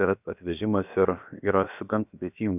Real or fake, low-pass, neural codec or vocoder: fake; 3.6 kHz; codec, 16 kHz, about 1 kbps, DyCAST, with the encoder's durations